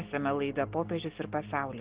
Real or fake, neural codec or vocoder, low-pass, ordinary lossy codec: real; none; 3.6 kHz; Opus, 16 kbps